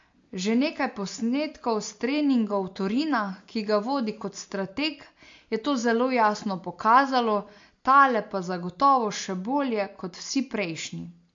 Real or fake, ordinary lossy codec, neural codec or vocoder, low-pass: real; MP3, 48 kbps; none; 7.2 kHz